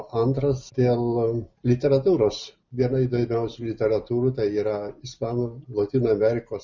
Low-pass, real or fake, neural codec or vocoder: 7.2 kHz; real; none